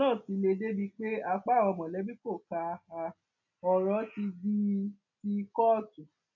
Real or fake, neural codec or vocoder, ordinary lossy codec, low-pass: real; none; none; 7.2 kHz